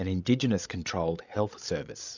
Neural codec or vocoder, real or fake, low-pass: codec, 16 kHz, 16 kbps, FreqCodec, larger model; fake; 7.2 kHz